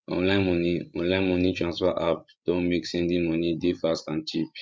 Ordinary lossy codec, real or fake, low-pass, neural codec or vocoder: none; fake; none; codec, 16 kHz, 16 kbps, FreqCodec, larger model